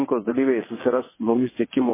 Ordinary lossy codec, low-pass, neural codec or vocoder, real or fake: MP3, 16 kbps; 3.6 kHz; vocoder, 22.05 kHz, 80 mel bands, WaveNeXt; fake